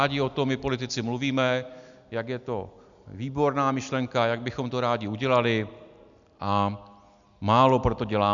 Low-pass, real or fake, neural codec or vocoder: 7.2 kHz; real; none